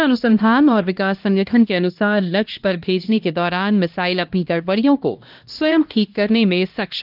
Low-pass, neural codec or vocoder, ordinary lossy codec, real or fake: 5.4 kHz; codec, 16 kHz, 1 kbps, X-Codec, HuBERT features, trained on LibriSpeech; Opus, 32 kbps; fake